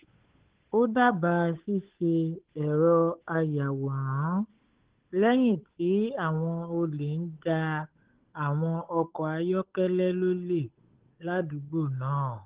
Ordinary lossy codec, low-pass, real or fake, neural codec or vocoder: Opus, 16 kbps; 3.6 kHz; fake; codec, 16 kHz, 8 kbps, FunCodec, trained on Chinese and English, 25 frames a second